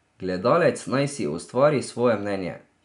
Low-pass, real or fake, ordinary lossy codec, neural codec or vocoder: 10.8 kHz; real; none; none